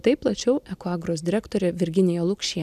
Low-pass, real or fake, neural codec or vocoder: 14.4 kHz; real; none